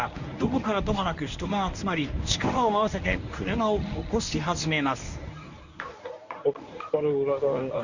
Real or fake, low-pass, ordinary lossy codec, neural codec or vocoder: fake; 7.2 kHz; none; codec, 24 kHz, 0.9 kbps, WavTokenizer, medium speech release version 1